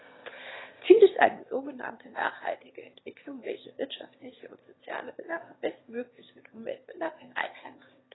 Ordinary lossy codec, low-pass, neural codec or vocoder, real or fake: AAC, 16 kbps; 7.2 kHz; autoencoder, 22.05 kHz, a latent of 192 numbers a frame, VITS, trained on one speaker; fake